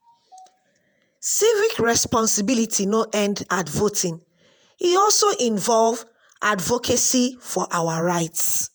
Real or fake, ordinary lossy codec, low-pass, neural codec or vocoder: fake; none; none; vocoder, 48 kHz, 128 mel bands, Vocos